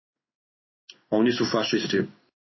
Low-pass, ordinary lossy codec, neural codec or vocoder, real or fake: 7.2 kHz; MP3, 24 kbps; codec, 16 kHz in and 24 kHz out, 1 kbps, XY-Tokenizer; fake